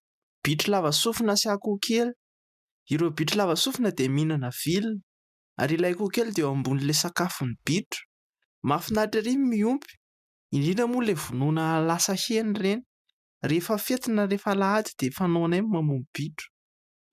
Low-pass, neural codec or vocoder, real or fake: 14.4 kHz; none; real